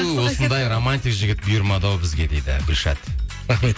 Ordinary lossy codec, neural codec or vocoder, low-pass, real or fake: none; none; none; real